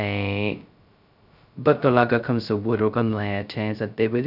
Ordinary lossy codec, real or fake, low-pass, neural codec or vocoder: none; fake; 5.4 kHz; codec, 16 kHz, 0.2 kbps, FocalCodec